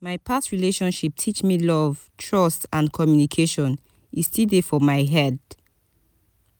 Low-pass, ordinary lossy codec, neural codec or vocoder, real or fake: none; none; none; real